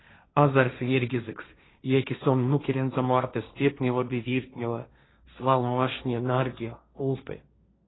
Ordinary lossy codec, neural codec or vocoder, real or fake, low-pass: AAC, 16 kbps; codec, 16 kHz, 1.1 kbps, Voila-Tokenizer; fake; 7.2 kHz